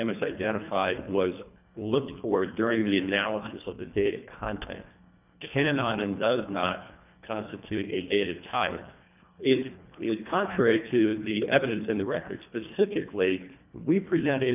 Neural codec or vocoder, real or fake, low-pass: codec, 24 kHz, 1.5 kbps, HILCodec; fake; 3.6 kHz